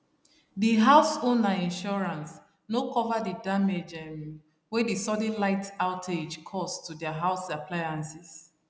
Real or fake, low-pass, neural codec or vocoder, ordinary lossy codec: real; none; none; none